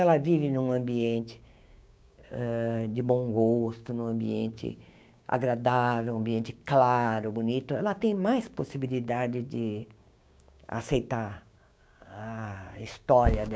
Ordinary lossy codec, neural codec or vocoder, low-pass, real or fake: none; codec, 16 kHz, 6 kbps, DAC; none; fake